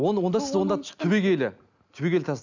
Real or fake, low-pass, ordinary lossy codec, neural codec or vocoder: real; 7.2 kHz; none; none